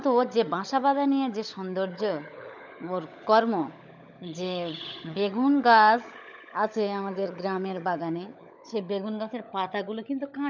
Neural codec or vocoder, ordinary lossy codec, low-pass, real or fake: codec, 16 kHz, 16 kbps, FunCodec, trained on LibriTTS, 50 frames a second; none; 7.2 kHz; fake